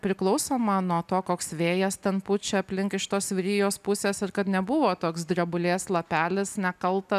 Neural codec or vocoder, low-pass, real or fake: none; 14.4 kHz; real